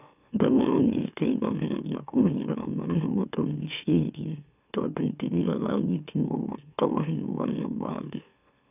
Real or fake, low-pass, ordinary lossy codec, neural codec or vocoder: fake; 3.6 kHz; none; autoencoder, 44.1 kHz, a latent of 192 numbers a frame, MeloTTS